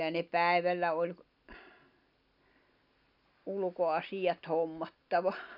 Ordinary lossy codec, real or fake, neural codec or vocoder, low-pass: none; real; none; 5.4 kHz